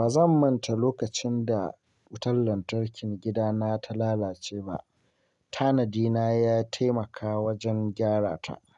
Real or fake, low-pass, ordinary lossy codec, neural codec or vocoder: real; 10.8 kHz; none; none